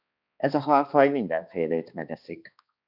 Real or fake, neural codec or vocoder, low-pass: fake; codec, 16 kHz, 2 kbps, X-Codec, HuBERT features, trained on balanced general audio; 5.4 kHz